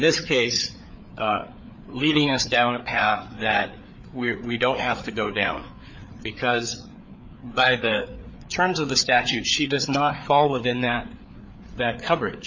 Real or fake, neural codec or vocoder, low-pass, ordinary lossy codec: fake; codec, 16 kHz, 4 kbps, FreqCodec, larger model; 7.2 kHz; MP3, 48 kbps